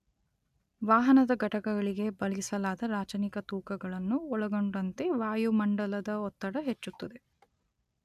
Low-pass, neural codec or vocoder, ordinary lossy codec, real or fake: 14.4 kHz; none; none; real